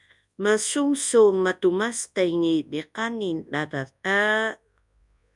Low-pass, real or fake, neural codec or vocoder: 10.8 kHz; fake; codec, 24 kHz, 0.9 kbps, WavTokenizer, large speech release